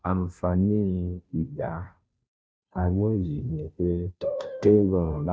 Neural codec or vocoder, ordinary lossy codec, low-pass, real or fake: codec, 16 kHz, 0.5 kbps, FunCodec, trained on Chinese and English, 25 frames a second; none; none; fake